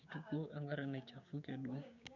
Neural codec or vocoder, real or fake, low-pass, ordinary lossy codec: codec, 16 kHz, 6 kbps, DAC; fake; 7.2 kHz; none